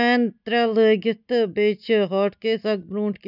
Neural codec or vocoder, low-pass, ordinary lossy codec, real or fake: none; 5.4 kHz; none; real